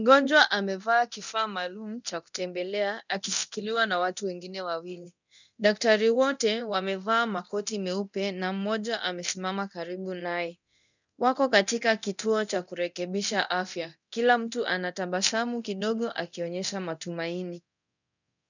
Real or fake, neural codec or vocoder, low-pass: fake; codec, 24 kHz, 0.9 kbps, DualCodec; 7.2 kHz